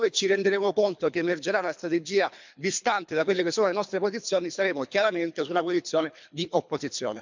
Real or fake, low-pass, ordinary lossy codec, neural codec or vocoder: fake; 7.2 kHz; MP3, 64 kbps; codec, 24 kHz, 3 kbps, HILCodec